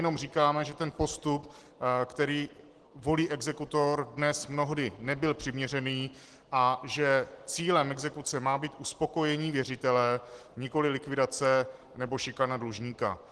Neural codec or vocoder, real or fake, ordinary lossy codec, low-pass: none; real; Opus, 16 kbps; 10.8 kHz